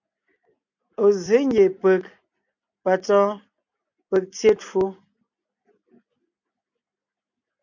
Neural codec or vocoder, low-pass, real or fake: none; 7.2 kHz; real